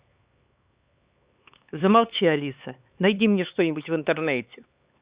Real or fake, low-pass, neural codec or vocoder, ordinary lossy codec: fake; 3.6 kHz; codec, 16 kHz, 4 kbps, X-Codec, HuBERT features, trained on LibriSpeech; Opus, 64 kbps